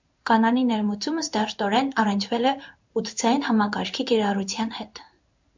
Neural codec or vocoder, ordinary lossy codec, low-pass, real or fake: codec, 16 kHz in and 24 kHz out, 1 kbps, XY-Tokenizer; MP3, 64 kbps; 7.2 kHz; fake